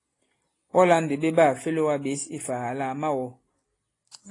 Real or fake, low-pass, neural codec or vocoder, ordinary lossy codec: real; 10.8 kHz; none; AAC, 32 kbps